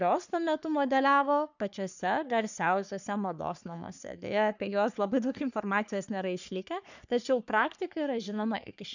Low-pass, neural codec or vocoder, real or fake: 7.2 kHz; codec, 44.1 kHz, 3.4 kbps, Pupu-Codec; fake